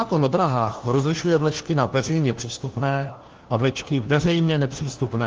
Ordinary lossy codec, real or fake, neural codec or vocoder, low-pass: Opus, 16 kbps; fake; codec, 16 kHz, 1 kbps, FunCodec, trained on LibriTTS, 50 frames a second; 7.2 kHz